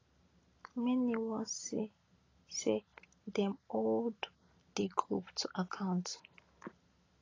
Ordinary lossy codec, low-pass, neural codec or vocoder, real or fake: AAC, 32 kbps; 7.2 kHz; none; real